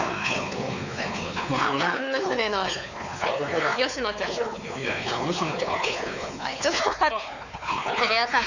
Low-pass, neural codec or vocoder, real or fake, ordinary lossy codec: 7.2 kHz; codec, 16 kHz, 4 kbps, X-Codec, WavLM features, trained on Multilingual LibriSpeech; fake; none